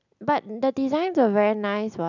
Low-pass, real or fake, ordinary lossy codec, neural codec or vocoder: 7.2 kHz; real; none; none